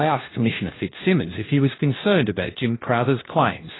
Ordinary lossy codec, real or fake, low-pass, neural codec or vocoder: AAC, 16 kbps; fake; 7.2 kHz; codec, 16 kHz, 1 kbps, FunCodec, trained on LibriTTS, 50 frames a second